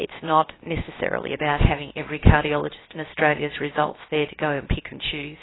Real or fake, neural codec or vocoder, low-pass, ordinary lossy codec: fake; codec, 16 kHz, 0.7 kbps, FocalCodec; 7.2 kHz; AAC, 16 kbps